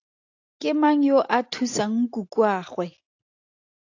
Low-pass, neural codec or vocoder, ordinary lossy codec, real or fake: 7.2 kHz; none; AAC, 48 kbps; real